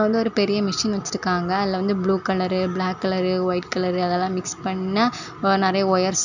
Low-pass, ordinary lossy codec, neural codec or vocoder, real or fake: 7.2 kHz; none; none; real